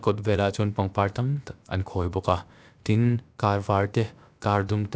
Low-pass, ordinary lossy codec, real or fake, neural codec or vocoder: none; none; fake; codec, 16 kHz, about 1 kbps, DyCAST, with the encoder's durations